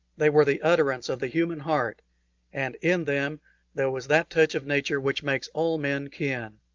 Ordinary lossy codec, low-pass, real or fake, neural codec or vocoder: Opus, 32 kbps; 7.2 kHz; real; none